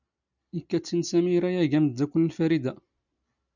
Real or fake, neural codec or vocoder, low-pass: real; none; 7.2 kHz